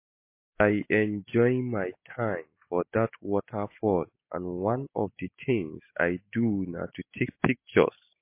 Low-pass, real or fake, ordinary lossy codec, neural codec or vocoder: 3.6 kHz; real; MP3, 24 kbps; none